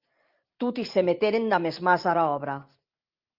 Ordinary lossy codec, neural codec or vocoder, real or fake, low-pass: Opus, 32 kbps; vocoder, 44.1 kHz, 128 mel bands every 512 samples, BigVGAN v2; fake; 5.4 kHz